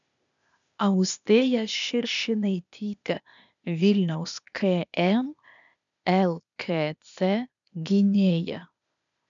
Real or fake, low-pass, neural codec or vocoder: fake; 7.2 kHz; codec, 16 kHz, 0.8 kbps, ZipCodec